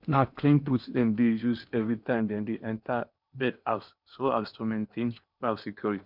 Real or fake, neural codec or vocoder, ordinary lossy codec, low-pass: fake; codec, 16 kHz in and 24 kHz out, 0.8 kbps, FocalCodec, streaming, 65536 codes; none; 5.4 kHz